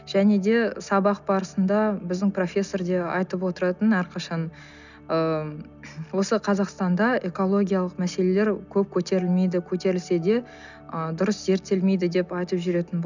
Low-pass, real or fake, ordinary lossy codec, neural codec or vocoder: 7.2 kHz; real; none; none